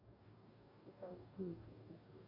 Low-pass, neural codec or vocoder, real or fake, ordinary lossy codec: 5.4 kHz; codec, 44.1 kHz, 2.6 kbps, DAC; fake; none